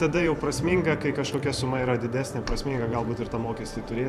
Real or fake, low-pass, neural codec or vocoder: fake; 14.4 kHz; vocoder, 44.1 kHz, 128 mel bands every 512 samples, BigVGAN v2